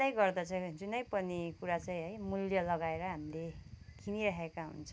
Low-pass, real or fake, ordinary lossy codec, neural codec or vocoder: none; real; none; none